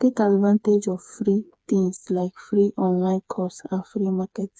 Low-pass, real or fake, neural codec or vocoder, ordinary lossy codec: none; fake; codec, 16 kHz, 4 kbps, FreqCodec, smaller model; none